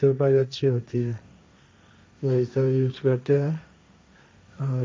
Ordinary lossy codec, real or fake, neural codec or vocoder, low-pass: none; fake; codec, 16 kHz, 1.1 kbps, Voila-Tokenizer; none